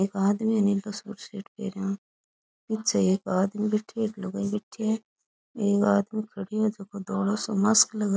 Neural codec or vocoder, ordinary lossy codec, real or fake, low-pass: none; none; real; none